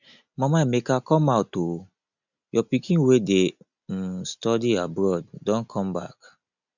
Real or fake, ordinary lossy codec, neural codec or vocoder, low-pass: real; none; none; 7.2 kHz